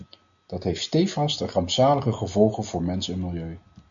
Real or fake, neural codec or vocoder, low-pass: real; none; 7.2 kHz